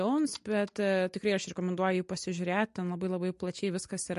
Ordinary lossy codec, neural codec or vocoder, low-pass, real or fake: MP3, 48 kbps; none; 14.4 kHz; real